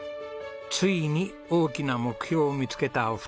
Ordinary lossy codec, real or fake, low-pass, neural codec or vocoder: none; real; none; none